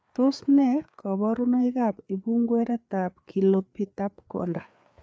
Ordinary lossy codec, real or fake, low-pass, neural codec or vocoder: none; fake; none; codec, 16 kHz, 4 kbps, FreqCodec, larger model